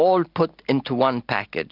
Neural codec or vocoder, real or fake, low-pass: none; real; 5.4 kHz